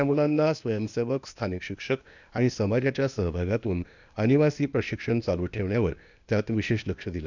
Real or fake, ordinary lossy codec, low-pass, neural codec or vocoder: fake; none; 7.2 kHz; codec, 16 kHz, 0.8 kbps, ZipCodec